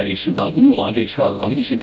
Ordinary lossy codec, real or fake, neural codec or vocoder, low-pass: none; fake; codec, 16 kHz, 0.5 kbps, FreqCodec, smaller model; none